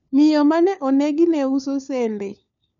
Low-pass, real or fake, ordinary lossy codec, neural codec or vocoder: 7.2 kHz; fake; none; codec, 16 kHz, 4 kbps, FunCodec, trained on LibriTTS, 50 frames a second